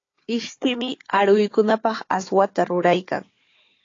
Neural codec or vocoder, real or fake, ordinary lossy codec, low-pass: codec, 16 kHz, 4 kbps, FunCodec, trained on Chinese and English, 50 frames a second; fake; AAC, 32 kbps; 7.2 kHz